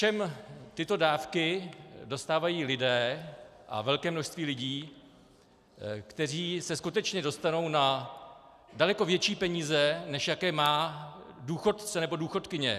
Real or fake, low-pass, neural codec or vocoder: real; 14.4 kHz; none